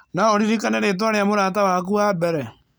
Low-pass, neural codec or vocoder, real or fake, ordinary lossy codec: none; none; real; none